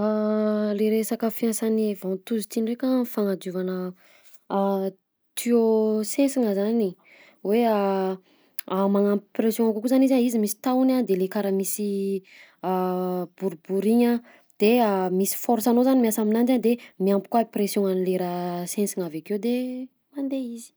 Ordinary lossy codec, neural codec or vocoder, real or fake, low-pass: none; none; real; none